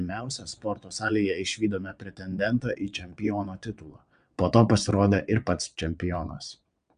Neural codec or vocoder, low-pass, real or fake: vocoder, 22.05 kHz, 80 mel bands, WaveNeXt; 9.9 kHz; fake